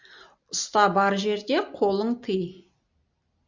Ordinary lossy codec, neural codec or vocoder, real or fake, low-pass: Opus, 64 kbps; none; real; 7.2 kHz